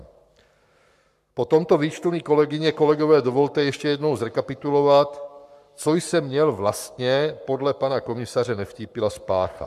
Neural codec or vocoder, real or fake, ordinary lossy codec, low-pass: codec, 44.1 kHz, 7.8 kbps, Pupu-Codec; fake; MP3, 96 kbps; 14.4 kHz